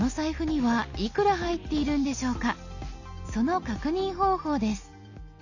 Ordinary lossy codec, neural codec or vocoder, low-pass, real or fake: none; none; 7.2 kHz; real